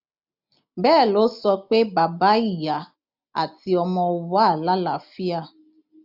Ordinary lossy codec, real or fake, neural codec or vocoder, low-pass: none; real; none; 5.4 kHz